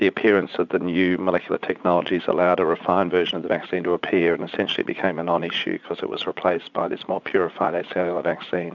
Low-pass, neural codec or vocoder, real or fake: 7.2 kHz; vocoder, 44.1 kHz, 80 mel bands, Vocos; fake